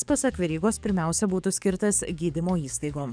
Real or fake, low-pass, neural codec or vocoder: fake; 9.9 kHz; codec, 44.1 kHz, 7.8 kbps, DAC